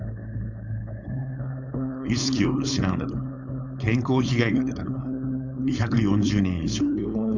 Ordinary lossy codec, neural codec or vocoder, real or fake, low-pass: none; codec, 16 kHz, 4.8 kbps, FACodec; fake; 7.2 kHz